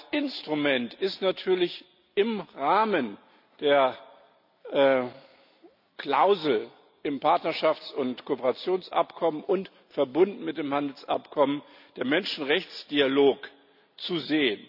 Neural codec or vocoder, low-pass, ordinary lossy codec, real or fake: none; 5.4 kHz; none; real